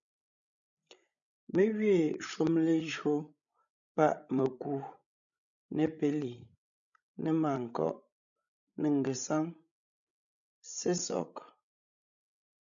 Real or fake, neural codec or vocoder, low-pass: fake; codec, 16 kHz, 16 kbps, FreqCodec, larger model; 7.2 kHz